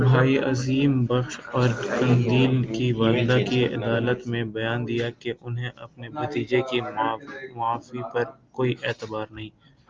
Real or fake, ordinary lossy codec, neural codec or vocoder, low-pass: real; Opus, 24 kbps; none; 7.2 kHz